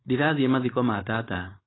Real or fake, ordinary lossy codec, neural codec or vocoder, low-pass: fake; AAC, 16 kbps; codec, 16 kHz, 4.8 kbps, FACodec; 7.2 kHz